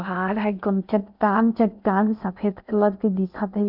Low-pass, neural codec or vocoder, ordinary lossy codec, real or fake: 5.4 kHz; codec, 16 kHz in and 24 kHz out, 0.6 kbps, FocalCodec, streaming, 4096 codes; none; fake